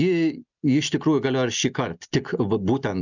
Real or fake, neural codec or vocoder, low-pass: real; none; 7.2 kHz